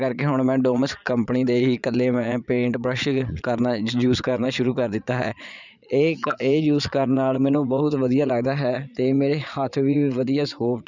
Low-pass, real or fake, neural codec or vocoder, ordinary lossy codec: 7.2 kHz; fake; vocoder, 22.05 kHz, 80 mel bands, Vocos; none